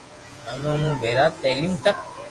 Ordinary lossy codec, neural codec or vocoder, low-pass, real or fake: Opus, 24 kbps; vocoder, 48 kHz, 128 mel bands, Vocos; 10.8 kHz; fake